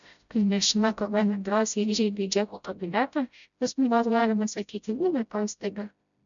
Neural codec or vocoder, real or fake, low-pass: codec, 16 kHz, 0.5 kbps, FreqCodec, smaller model; fake; 7.2 kHz